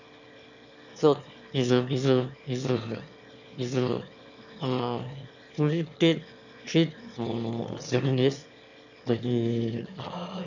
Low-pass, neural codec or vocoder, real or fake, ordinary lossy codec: 7.2 kHz; autoencoder, 22.05 kHz, a latent of 192 numbers a frame, VITS, trained on one speaker; fake; none